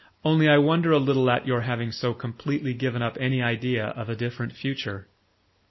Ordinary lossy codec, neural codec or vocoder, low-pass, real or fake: MP3, 24 kbps; none; 7.2 kHz; real